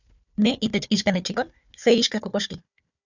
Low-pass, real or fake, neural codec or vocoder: 7.2 kHz; fake; codec, 16 kHz, 4 kbps, FunCodec, trained on Chinese and English, 50 frames a second